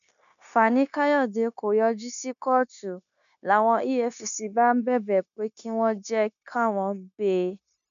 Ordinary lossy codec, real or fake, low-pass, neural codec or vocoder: none; fake; 7.2 kHz; codec, 16 kHz, 0.9 kbps, LongCat-Audio-Codec